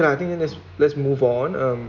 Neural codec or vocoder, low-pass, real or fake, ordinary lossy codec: none; 7.2 kHz; real; none